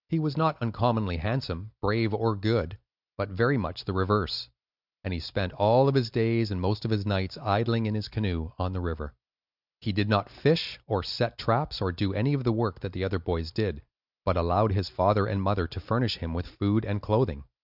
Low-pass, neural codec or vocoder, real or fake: 5.4 kHz; none; real